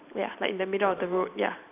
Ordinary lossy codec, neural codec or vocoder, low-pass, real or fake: none; vocoder, 44.1 kHz, 128 mel bands every 512 samples, BigVGAN v2; 3.6 kHz; fake